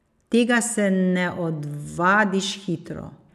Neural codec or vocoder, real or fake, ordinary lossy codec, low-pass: none; real; none; 14.4 kHz